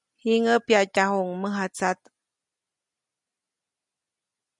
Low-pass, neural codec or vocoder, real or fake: 10.8 kHz; none; real